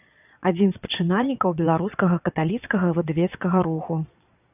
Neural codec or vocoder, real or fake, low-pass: vocoder, 22.05 kHz, 80 mel bands, Vocos; fake; 3.6 kHz